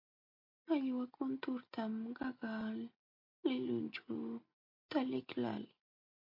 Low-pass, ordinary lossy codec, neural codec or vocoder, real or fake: 5.4 kHz; MP3, 32 kbps; none; real